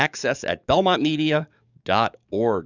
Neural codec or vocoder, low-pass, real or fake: codec, 44.1 kHz, 7.8 kbps, DAC; 7.2 kHz; fake